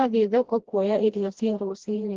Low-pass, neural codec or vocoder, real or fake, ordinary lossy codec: 7.2 kHz; codec, 16 kHz, 1 kbps, FreqCodec, smaller model; fake; Opus, 16 kbps